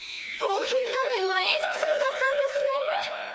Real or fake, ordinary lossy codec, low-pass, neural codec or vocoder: fake; none; none; codec, 16 kHz, 1 kbps, FreqCodec, larger model